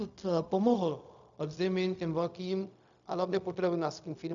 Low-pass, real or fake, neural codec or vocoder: 7.2 kHz; fake; codec, 16 kHz, 0.4 kbps, LongCat-Audio-Codec